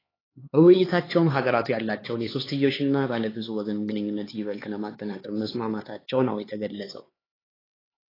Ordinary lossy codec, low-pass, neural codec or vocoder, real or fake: AAC, 24 kbps; 5.4 kHz; codec, 16 kHz, 4 kbps, X-Codec, HuBERT features, trained on LibriSpeech; fake